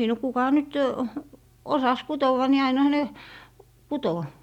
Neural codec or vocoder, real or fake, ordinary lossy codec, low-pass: none; real; none; 19.8 kHz